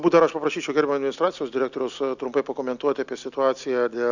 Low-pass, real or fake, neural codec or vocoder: 7.2 kHz; real; none